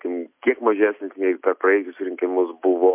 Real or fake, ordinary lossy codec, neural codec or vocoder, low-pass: real; MP3, 32 kbps; none; 3.6 kHz